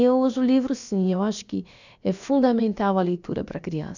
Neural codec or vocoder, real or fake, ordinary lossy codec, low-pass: codec, 16 kHz, about 1 kbps, DyCAST, with the encoder's durations; fake; none; 7.2 kHz